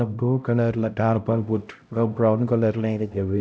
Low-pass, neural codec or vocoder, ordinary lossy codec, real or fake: none; codec, 16 kHz, 0.5 kbps, X-Codec, HuBERT features, trained on LibriSpeech; none; fake